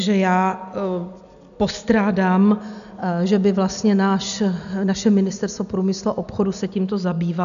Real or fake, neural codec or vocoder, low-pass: real; none; 7.2 kHz